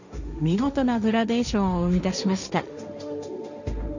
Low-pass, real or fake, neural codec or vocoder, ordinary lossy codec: 7.2 kHz; fake; codec, 16 kHz, 1.1 kbps, Voila-Tokenizer; none